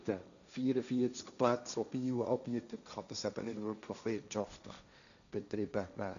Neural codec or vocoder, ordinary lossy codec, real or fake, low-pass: codec, 16 kHz, 1.1 kbps, Voila-Tokenizer; none; fake; 7.2 kHz